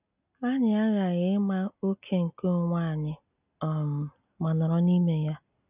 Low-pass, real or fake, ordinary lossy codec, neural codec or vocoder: 3.6 kHz; real; none; none